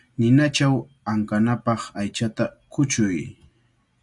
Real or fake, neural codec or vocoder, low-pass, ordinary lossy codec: real; none; 10.8 kHz; MP3, 96 kbps